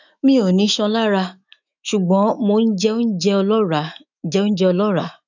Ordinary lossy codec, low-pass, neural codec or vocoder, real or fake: none; 7.2 kHz; autoencoder, 48 kHz, 128 numbers a frame, DAC-VAE, trained on Japanese speech; fake